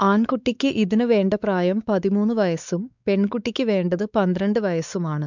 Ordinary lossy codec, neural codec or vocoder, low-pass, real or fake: none; codec, 16 kHz, 4 kbps, X-Codec, WavLM features, trained on Multilingual LibriSpeech; 7.2 kHz; fake